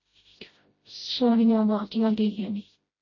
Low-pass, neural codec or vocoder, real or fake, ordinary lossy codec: 7.2 kHz; codec, 16 kHz, 0.5 kbps, FreqCodec, smaller model; fake; MP3, 32 kbps